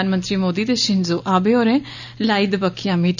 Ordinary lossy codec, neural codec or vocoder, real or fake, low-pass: none; none; real; 7.2 kHz